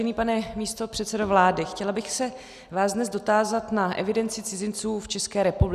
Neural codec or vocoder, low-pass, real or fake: none; 14.4 kHz; real